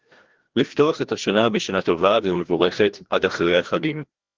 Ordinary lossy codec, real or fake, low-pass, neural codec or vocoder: Opus, 16 kbps; fake; 7.2 kHz; codec, 16 kHz, 1 kbps, FreqCodec, larger model